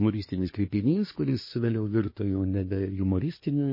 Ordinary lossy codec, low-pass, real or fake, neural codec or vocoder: MP3, 24 kbps; 5.4 kHz; fake; codec, 24 kHz, 1 kbps, SNAC